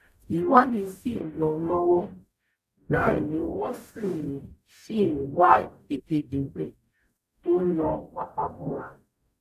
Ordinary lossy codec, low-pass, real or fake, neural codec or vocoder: none; 14.4 kHz; fake; codec, 44.1 kHz, 0.9 kbps, DAC